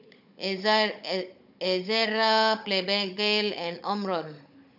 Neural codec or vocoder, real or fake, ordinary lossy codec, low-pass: codec, 16 kHz, 16 kbps, FunCodec, trained on Chinese and English, 50 frames a second; fake; none; 5.4 kHz